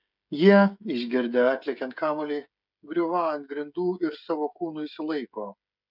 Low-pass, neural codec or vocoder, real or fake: 5.4 kHz; codec, 16 kHz, 16 kbps, FreqCodec, smaller model; fake